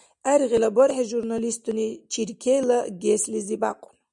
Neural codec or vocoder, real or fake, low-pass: none; real; 10.8 kHz